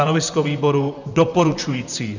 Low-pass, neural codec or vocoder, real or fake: 7.2 kHz; vocoder, 44.1 kHz, 128 mel bands, Pupu-Vocoder; fake